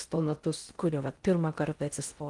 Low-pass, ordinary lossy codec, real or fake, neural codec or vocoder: 10.8 kHz; Opus, 24 kbps; fake; codec, 16 kHz in and 24 kHz out, 0.6 kbps, FocalCodec, streaming, 2048 codes